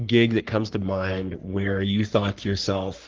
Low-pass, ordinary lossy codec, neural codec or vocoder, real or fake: 7.2 kHz; Opus, 16 kbps; codec, 44.1 kHz, 3.4 kbps, Pupu-Codec; fake